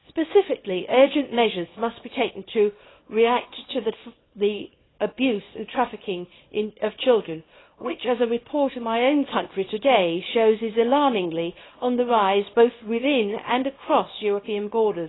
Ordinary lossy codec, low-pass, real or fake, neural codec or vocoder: AAC, 16 kbps; 7.2 kHz; fake; codec, 24 kHz, 0.9 kbps, WavTokenizer, small release